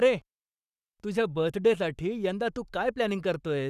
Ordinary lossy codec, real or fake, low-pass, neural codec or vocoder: none; fake; 14.4 kHz; codec, 44.1 kHz, 7.8 kbps, Pupu-Codec